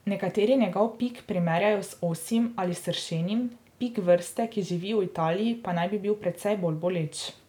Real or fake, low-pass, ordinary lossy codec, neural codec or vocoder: real; 19.8 kHz; none; none